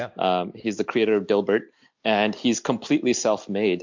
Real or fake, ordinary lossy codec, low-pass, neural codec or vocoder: real; MP3, 64 kbps; 7.2 kHz; none